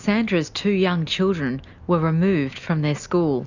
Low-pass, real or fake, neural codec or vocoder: 7.2 kHz; real; none